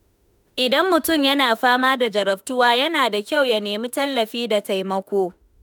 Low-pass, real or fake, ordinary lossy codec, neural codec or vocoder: none; fake; none; autoencoder, 48 kHz, 32 numbers a frame, DAC-VAE, trained on Japanese speech